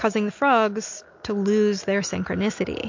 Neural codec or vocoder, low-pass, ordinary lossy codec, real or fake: none; 7.2 kHz; MP3, 48 kbps; real